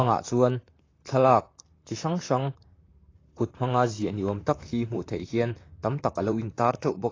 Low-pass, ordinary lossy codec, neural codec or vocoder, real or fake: 7.2 kHz; AAC, 32 kbps; vocoder, 44.1 kHz, 128 mel bands, Pupu-Vocoder; fake